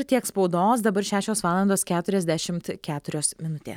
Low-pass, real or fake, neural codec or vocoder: 19.8 kHz; real; none